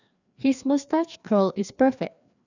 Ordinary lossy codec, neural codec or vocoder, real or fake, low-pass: none; codec, 16 kHz, 2 kbps, FreqCodec, larger model; fake; 7.2 kHz